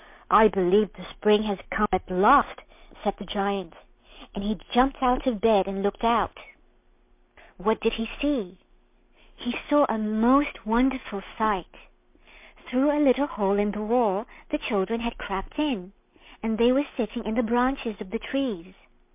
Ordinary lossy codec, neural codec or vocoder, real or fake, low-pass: MP3, 32 kbps; none; real; 3.6 kHz